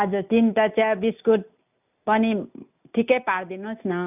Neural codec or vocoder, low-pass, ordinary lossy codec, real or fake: none; 3.6 kHz; none; real